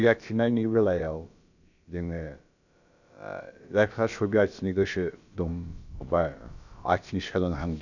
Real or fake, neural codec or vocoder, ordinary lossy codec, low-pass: fake; codec, 16 kHz, about 1 kbps, DyCAST, with the encoder's durations; none; 7.2 kHz